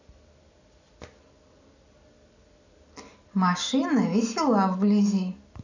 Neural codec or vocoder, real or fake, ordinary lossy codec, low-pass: none; real; none; 7.2 kHz